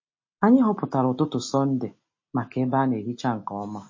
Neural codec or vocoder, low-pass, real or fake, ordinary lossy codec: none; 7.2 kHz; real; MP3, 32 kbps